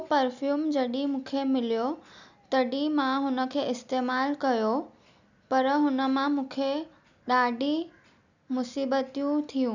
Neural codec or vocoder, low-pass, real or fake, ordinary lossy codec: none; 7.2 kHz; real; none